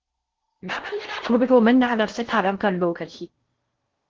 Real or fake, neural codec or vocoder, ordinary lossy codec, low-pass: fake; codec, 16 kHz in and 24 kHz out, 0.6 kbps, FocalCodec, streaming, 4096 codes; Opus, 16 kbps; 7.2 kHz